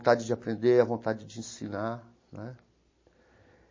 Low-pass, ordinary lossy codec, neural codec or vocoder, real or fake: 7.2 kHz; MP3, 32 kbps; none; real